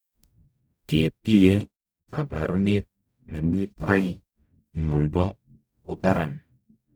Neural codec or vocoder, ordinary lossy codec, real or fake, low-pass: codec, 44.1 kHz, 0.9 kbps, DAC; none; fake; none